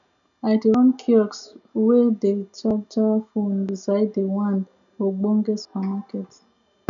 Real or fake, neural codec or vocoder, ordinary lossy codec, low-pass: real; none; none; 7.2 kHz